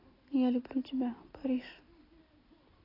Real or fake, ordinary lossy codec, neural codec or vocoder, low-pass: real; AAC, 24 kbps; none; 5.4 kHz